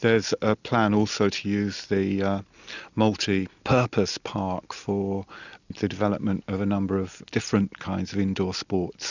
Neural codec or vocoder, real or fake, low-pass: none; real; 7.2 kHz